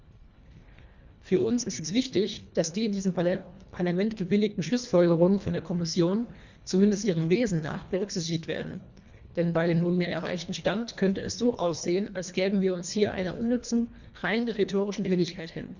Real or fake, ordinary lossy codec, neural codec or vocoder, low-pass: fake; Opus, 64 kbps; codec, 24 kHz, 1.5 kbps, HILCodec; 7.2 kHz